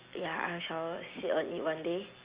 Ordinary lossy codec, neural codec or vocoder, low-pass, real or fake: Opus, 64 kbps; none; 3.6 kHz; real